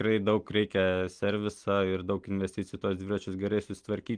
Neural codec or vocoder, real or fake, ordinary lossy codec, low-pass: none; real; Opus, 24 kbps; 9.9 kHz